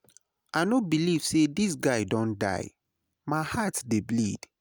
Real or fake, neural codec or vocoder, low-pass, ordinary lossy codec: real; none; none; none